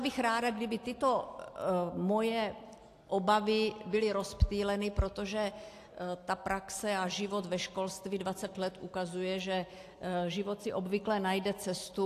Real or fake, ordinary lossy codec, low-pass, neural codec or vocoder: real; AAC, 64 kbps; 14.4 kHz; none